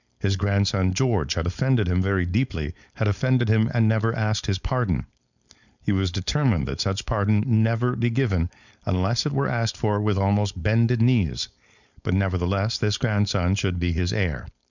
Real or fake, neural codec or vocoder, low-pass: fake; codec, 16 kHz, 4.8 kbps, FACodec; 7.2 kHz